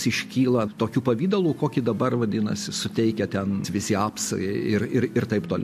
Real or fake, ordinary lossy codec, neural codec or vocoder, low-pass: real; MP3, 64 kbps; none; 14.4 kHz